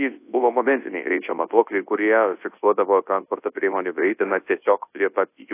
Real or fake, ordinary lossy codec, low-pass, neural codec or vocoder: fake; AAC, 24 kbps; 3.6 kHz; codec, 24 kHz, 0.9 kbps, WavTokenizer, large speech release